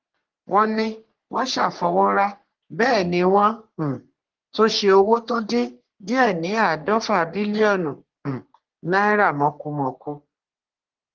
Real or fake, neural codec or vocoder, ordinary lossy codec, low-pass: fake; codec, 44.1 kHz, 3.4 kbps, Pupu-Codec; Opus, 16 kbps; 7.2 kHz